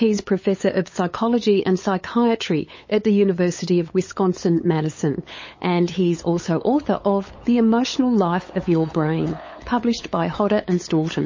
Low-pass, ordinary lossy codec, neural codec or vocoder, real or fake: 7.2 kHz; MP3, 32 kbps; codec, 16 kHz, 8 kbps, FunCodec, trained on LibriTTS, 25 frames a second; fake